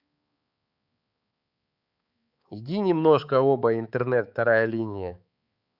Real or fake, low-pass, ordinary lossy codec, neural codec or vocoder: fake; 5.4 kHz; Opus, 64 kbps; codec, 16 kHz, 4 kbps, X-Codec, HuBERT features, trained on balanced general audio